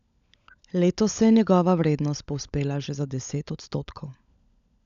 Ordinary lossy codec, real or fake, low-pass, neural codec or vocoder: none; fake; 7.2 kHz; codec, 16 kHz, 16 kbps, FunCodec, trained on LibriTTS, 50 frames a second